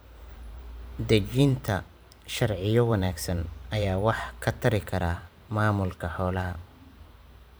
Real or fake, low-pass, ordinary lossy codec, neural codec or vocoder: fake; none; none; vocoder, 44.1 kHz, 128 mel bands every 512 samples, BigVGAN v2